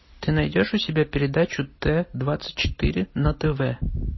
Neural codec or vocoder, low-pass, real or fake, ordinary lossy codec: none; 7.2 kHz; real; MP3, 24 kbps